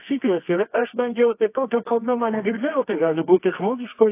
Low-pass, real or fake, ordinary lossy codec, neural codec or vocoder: 3.6 kHz; fake; AAC, 24 kbps; codec, 24 kHz, 0.9 kbps, WavTokenizer, medium music audio release